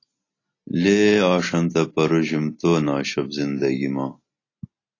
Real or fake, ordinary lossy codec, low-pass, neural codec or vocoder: real; AAC, 32 kbps; 7.2 kHz; none